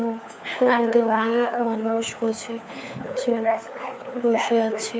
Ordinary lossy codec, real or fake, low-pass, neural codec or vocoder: none; fake; none; codec, 16 kHz, 4 kbps, FunCodec, trained on LibriTTS, 50 frames a second